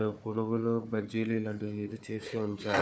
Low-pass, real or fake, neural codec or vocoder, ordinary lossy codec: none; fake; codec, 16 kHz, 4 kbps, FunCodec, trained on Chinese and English, 50 frames a second; none